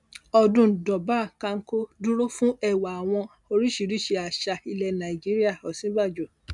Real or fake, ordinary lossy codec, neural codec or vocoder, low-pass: real; none; none; 10.8 kHz